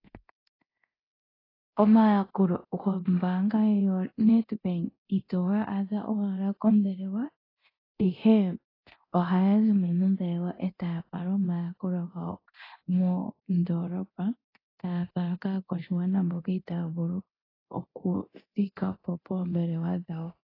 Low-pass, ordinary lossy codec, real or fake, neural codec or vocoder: 5.4 kHz; AAC, 24 kbps; fake; codec, 24 kHz, 0.9 kbps, DualCodec